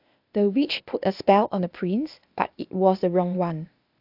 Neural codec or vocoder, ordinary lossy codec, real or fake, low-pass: codec, 16 kHz, 0.8 kbps, ZipCodec; AAC, 48 kbps; fake; 5.4 kHz